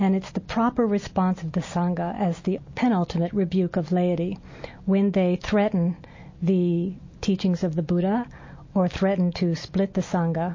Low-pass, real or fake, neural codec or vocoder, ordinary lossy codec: 7.2 kHz; real; none; MP3, 32 kbps